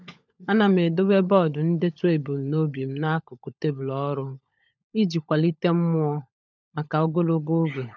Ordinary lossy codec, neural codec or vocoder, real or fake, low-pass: none; codec, 16 kHz, 16 kbps, FunCodec, trained on LibriTTS, 50 frames a second; fake; none